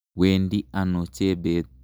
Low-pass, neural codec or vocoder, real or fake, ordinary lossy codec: none; none; real; none